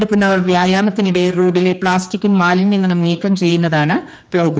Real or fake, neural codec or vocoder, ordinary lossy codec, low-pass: fake; codec, 16 kHz, 2 kbps, X-Codec, HuBERT features, trained on general audio; none; none